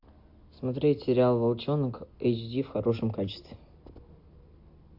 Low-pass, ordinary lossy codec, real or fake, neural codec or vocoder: 5.4 kHz; AAC, 48 kbps; real; none